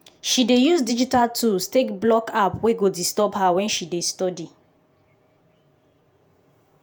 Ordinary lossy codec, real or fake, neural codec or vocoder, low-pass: none; fake; vocoder, 48 kHz, 128 mel bands, Vocos; none